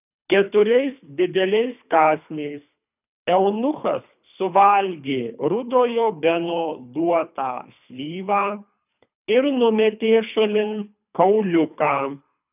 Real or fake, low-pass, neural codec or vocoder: fake; 3.6 kHz; codec, 24 kHz, 3 kbps, HILCodec